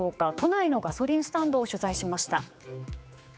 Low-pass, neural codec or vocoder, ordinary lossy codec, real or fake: none; codec, 16 kHz, 4 kbps, X-Codec, HuBERT features, trained on general audio; none; fake